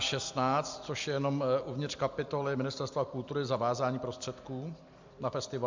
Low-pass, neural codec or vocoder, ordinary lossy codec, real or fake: 7.2 kHz; none; MP3, 64 kbps; real